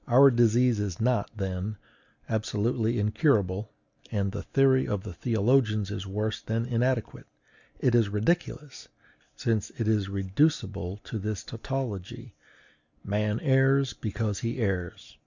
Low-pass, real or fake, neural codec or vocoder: 7.2 kHz; real; none